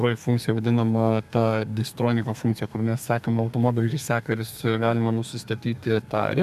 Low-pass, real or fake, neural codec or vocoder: 14.4 kHz; fake; codec, 32 kHz, 1.9 kbps, SNAC